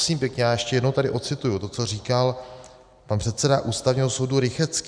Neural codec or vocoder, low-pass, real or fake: none; 9.9 kHz; real